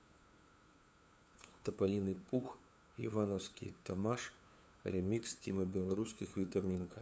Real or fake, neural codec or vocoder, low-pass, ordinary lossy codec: fake; codec, 16 kHz, 2 kbps, FunCodec, trained on LibriTTS, 25 frames a second; none; none